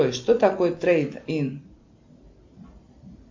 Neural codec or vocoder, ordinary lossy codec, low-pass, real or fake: none; MP3, 48 kbps; 7.2 kHz; real